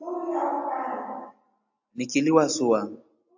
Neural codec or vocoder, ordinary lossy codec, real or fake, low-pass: none; AAC, 48 kbps; real; 7.2 kHz